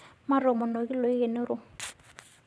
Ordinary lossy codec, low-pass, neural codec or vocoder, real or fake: none; none; vocoder, 22.05 kHz, 80 mel bands, WaveNeXt; fake